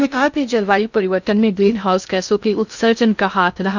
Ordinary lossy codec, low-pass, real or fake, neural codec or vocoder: none; 7.2 kHz; fake; codec, 16 kHz in and 24 kHz out, 0.6 kbps, FocalCodec, streaming, 2048 codes